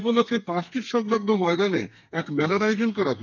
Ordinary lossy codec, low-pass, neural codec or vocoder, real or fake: none; 7.2 kHz; codec, 32 kHz, 1.9 kbps, SNAC; fake